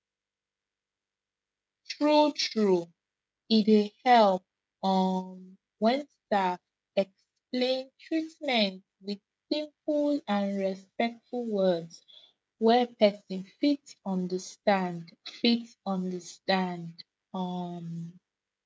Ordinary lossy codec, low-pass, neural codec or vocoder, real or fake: none; none; codec, 16 kHz, 16 kbps, FreqCodec, smaller model; fake